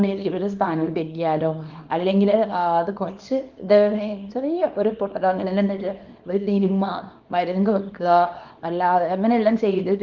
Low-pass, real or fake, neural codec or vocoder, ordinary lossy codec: 7.2 kHz; fake; codec, 24 kHz, 0.9 kbps, WavTokenizer, small release; Opus, 32 kbps